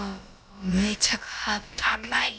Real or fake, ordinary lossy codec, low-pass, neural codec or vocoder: fake; none; none; codec, 16 kHz, about 1 kbps, DyCAST, with the encoder's durations